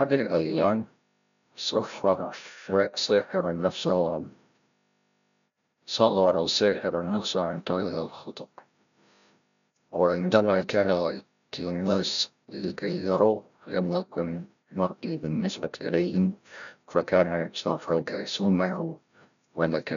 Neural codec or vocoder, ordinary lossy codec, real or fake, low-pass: codec, 16 kHz, 0.5 kbps, FreqCodec, larger model; MP3, 96 kbps; fake; 7.2 kHz